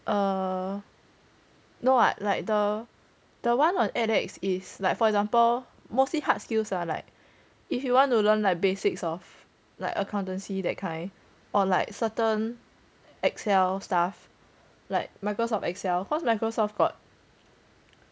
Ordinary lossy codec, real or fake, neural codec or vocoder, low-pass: none; real; none; none